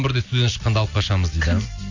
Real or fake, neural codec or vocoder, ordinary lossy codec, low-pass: real; none; AAC, 48 kbps; 7.2 kHz